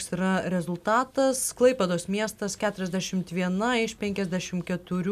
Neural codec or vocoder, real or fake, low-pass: none; real; 14.4 kHz